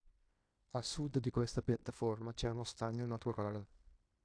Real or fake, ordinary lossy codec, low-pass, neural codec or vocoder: fake; AAC, 64 kbps; 9.9 kHz; codec, 16 kHz in and 24 kHz out, 0.9 kbps, LongCat-Audio-Codec, fine tuned four codebook decoder